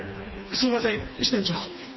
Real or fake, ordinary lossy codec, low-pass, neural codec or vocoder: fake; MP3, 24 kbps; 7.2 kHz; codec, 16 kHz, 2 kbps, FreqCodec, smaller model